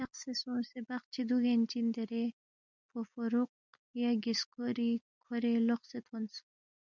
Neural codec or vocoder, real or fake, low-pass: none; real; 7.2 kHz